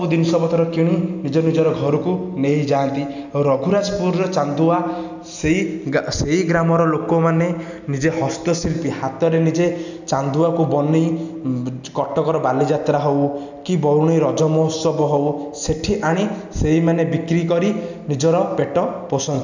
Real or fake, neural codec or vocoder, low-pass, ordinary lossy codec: real; none; 7.2 kHz; none